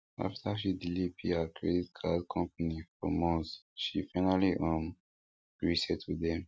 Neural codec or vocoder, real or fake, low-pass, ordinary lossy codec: none; real; none; none